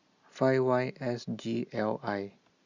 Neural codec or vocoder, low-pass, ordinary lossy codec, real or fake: none; 7.2 kHz; Opus, 64 kbps; real